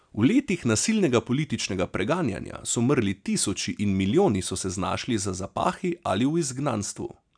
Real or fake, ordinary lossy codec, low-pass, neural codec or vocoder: real; none; 9.9 kHz; none